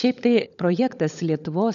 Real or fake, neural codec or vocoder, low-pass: fake; codec, 16 kHz, 16 kbps, FunCodec, trained on LibriTTS, 50 frames a second; 7.2 kHz